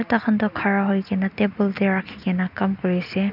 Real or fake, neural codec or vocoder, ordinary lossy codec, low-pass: real; none; none; 5.4 kHz